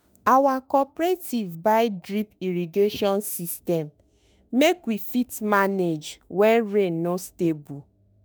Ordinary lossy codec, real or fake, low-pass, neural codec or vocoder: none; fake; none; autoencoder, 48 kHz, 32 numbers a frame, DAC-VAE, trained on Japanese speech